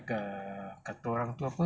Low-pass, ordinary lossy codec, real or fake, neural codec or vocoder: none; none; real; none